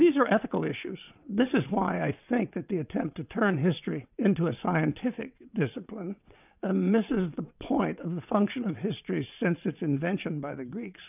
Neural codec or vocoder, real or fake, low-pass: vocoder, 22.05 kHz, 80 mel bands, WaveNeXt; fake; 3.6 kHz